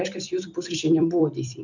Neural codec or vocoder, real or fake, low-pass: vocoder, 44.1 kHz, 128 mel bands every 256 samples, BigVGAN v2; fake; 7.2 kHz